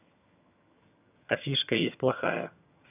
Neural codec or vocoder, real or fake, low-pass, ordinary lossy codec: vocoder, 22.05 kHz, 80 mel bands, HiFi-GAN; fake; 3.6 kHz; none